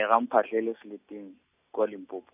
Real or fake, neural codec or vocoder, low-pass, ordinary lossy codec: real; none; 3.6 kHz; none